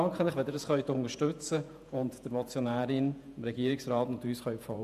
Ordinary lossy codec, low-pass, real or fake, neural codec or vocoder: none; 14.4 kHz; fake; vocoder, 48 kHz, 128 mel bands, Vocos